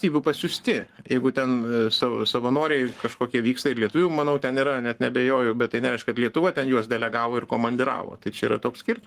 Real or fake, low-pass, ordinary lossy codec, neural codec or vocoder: fake; 14.4 kHz; Opus, 24 kbps; codec, 44.1 kHz, 7.8 kbps, Pupu-Codec